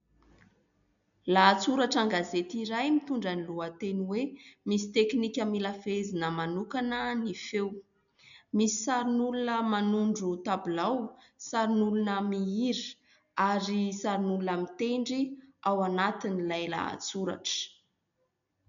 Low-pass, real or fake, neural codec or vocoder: 7.2 kHz; real; none